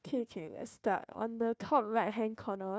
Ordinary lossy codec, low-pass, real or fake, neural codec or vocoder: none; none; fake; codec, 16 kHz, 1 kbps, FunCodec, trained on Chinese and English, 50 frames a second